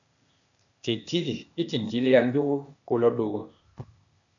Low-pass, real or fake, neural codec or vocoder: 7.2 kHz; fake; codec, 16 kHz, 0.8 kbps, ZipCodec